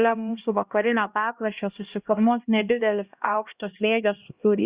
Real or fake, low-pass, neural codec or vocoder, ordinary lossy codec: fake; 3.6 kHz; codec, 16 kHz, 1 kbps, X-Codec, HuBERT features, trained on LibriSpeech; Opus, 64 kbps